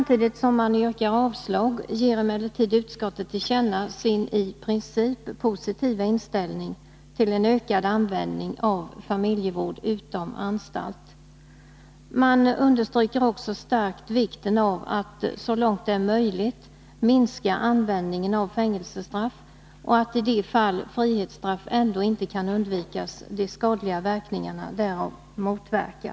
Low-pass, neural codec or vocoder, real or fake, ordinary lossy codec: none; none; real; none